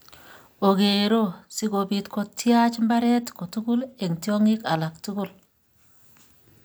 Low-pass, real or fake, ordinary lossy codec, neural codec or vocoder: none; real; none; none